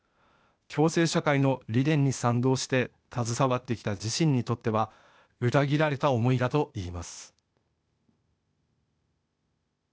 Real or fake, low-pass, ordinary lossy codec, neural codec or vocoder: fake; none; none; codec, 16 kHz, 0.8 kbps, ZipCodec